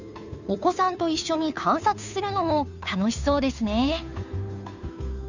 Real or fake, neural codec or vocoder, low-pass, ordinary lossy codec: fake; codec, 16 kHz, 2 kbps, FunCodec, trained on Chinese and English, 25 frames a second; 7.2 kHz; none